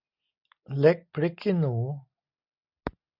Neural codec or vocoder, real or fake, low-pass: none; real; 5.4 kHz